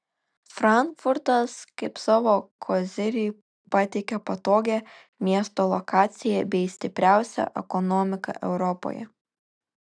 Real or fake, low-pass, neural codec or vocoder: real; 9.9 kHz; none